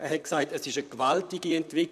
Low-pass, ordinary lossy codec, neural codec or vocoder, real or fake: 14.4 kHz; none; vocoder, 44.1 kHz, 128 mel bands, Pupu-Vocoder; fake